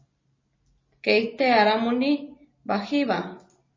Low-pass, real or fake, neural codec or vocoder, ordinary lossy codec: 7.2 kHz; real; none; MP3, 32 kbps